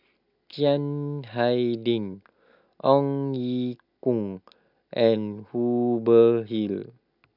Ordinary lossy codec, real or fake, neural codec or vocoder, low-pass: none; real; none; 5.4 kHz